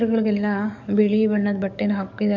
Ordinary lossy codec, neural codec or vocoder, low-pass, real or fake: none; codec, 44.1 kHz, 7.8 kbps, DAC; 7.2 kHz; fake